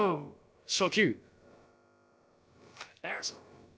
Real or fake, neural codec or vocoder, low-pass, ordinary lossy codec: fake; codec, 16 kHz, about 1 kbps, DyCAST, with the encoder's durations; none; none